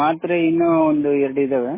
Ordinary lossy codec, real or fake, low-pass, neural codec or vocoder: MP3, 16 kbps; real; 3.6 kHz; none